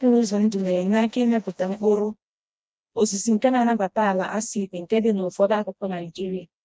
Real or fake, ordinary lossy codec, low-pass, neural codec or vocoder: fake; none; none; codec, 16 kHz, 1 kbps, FreqCodec, smaller model